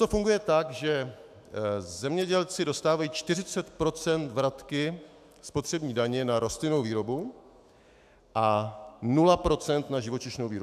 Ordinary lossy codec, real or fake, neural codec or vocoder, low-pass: AAC, 96 kbps; fake; autoencoder, 48 kHz, 128 numbers a frame, DAC-VAE, trained on Japanese speech; 14.4 kHz